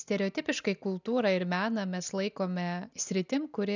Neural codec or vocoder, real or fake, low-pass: none; real; 7.2 kHz